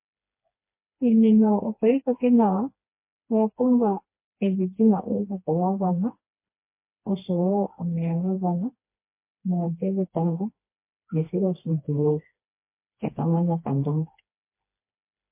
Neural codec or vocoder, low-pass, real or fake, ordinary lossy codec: codec, 16 kHz, 2 kbps, FreqCodec, smaller model; 3.6 kHz; fake; MP3, 24 kbps